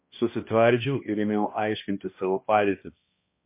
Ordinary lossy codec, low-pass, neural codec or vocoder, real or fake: MP3, 24 kbps; 3.6 kHz; codec, 16 kHz, 1 kbps, X-Codec, HuBERT features, trained on balanced general audio; fake